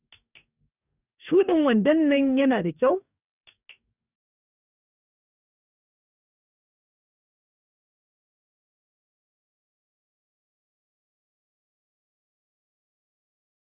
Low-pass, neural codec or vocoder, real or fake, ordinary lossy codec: 3.6 kHz; codec, 44.1 kHz, 2.6 kbps, DAC; fake; none